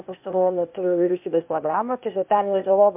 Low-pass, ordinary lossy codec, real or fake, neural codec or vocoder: 3.6 kHz; MP3, 32 kbps; fake; codec, 16 kHz, 0.8 kbps, ZipCodec